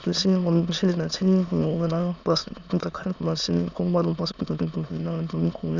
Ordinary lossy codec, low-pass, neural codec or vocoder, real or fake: none; 7.2 kHz; autoencoder, 22.05 kHz, a latent of 192 numbers a frame, VITS, trained on many speakers; fake